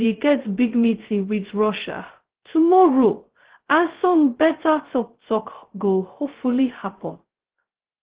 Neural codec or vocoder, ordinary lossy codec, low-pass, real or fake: codec, 16 kHz, 0.2 kbps, FocalCodec; Opus, 16 kbps; 3.6 kHz; fake